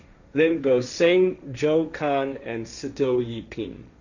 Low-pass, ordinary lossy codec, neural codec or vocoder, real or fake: 7.2 kHz; none; codec, 16 kHz, 1.1 kbps, Voila-Tokenizer; fake